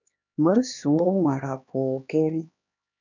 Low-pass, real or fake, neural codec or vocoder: 7.2 kHz; fake; codec, 16 kHz, 2 kbps, X-Codec, HuBERT features, trained on LibriSpeech